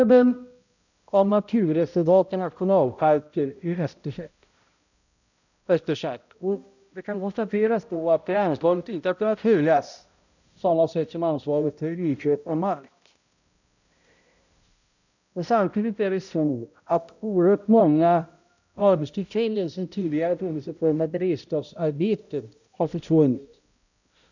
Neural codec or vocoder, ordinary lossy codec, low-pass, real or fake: codec, 16 kHz, 0.5 kbps, X-Codec, HuBERT features, trained on balanced general audio; none; 7.2 kHz; fake